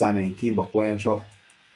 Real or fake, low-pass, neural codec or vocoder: fake; 10.8 kHz; codec, 32 kHz, 1.9 kbps, SNAC